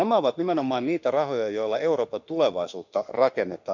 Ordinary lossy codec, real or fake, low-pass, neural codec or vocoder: none; fake; 7.2 kHz; autoencoder, 48 kHz, 32 numbers a frame, DAC-VAE, trained on Japanese speech